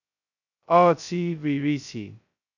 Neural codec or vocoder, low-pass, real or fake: codec, 16 kHz, 0.2 kbps, FocalCodec; 7.2 kHz; fake